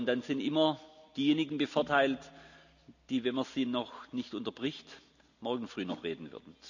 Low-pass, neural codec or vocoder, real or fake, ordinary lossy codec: 7.2 kHz; none; real; none